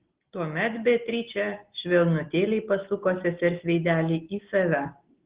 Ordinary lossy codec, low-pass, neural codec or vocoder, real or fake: Opus, 16 kbps; 3.6 kHz; none; real